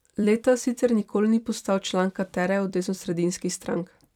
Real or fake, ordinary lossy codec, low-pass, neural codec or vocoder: fake; none; 19.8 kHz; vocoder, 44.1 kHz, 128 mel bands every 256 samples, BigVGAN v2